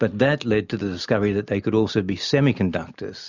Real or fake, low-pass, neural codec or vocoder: real; 7.2 kHz; none